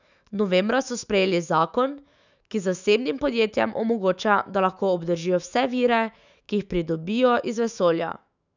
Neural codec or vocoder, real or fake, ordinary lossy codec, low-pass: autoencoder, 48 kHz, 128 numbers a frame, DAC-VAE, trained on Japanese speech; fake; none; 7.2 kHz